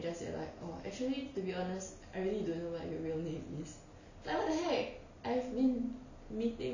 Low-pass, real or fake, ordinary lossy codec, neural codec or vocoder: 7.2 kHz; real; none; none